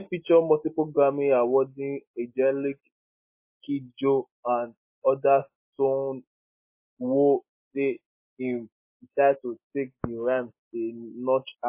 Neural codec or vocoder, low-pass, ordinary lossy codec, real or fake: none; 3.6 kHz; MP3, 24 kbps; real